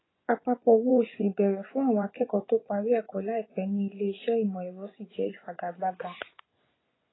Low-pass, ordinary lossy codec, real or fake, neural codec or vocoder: 7.2 kHz; AAC, 16 kbps; fake; vocoder, 24 kHz, 100 mel bands, Vocos